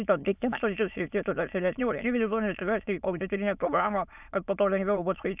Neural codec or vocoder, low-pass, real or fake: autoencoder, 22.05 kHz, a latent of 192 numbers a frame, VITS, trained on many speakers; 3.6 kHz; fake